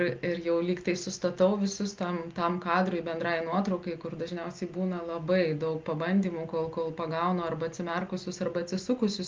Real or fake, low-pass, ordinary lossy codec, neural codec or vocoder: real; 7.2 kHz; Opus, 24 kbps; none